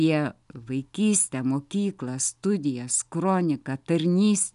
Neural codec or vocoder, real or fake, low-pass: none; real; 10.8 kHz